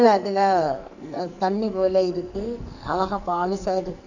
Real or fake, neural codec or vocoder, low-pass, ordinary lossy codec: fake; codec, 44.1 kHz, 2.6 kbps, SNAC; 7.2 kHz; none